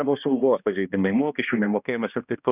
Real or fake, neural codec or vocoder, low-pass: fake; codec, 16 kHz, 1 kbps, X-Codec, HuBERT features, trained on balanced general audio; 3.6 kHz